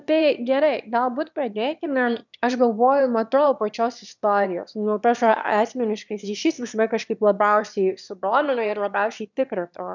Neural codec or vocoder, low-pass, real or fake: autoencoder, 22.05 kHz, a latent of 192 numbers a frame, VITS, trained on one speaker; 7.2 kHz; fake